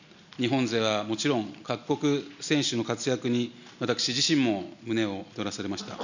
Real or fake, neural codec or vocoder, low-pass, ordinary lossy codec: real; none; 7.2 kHz; none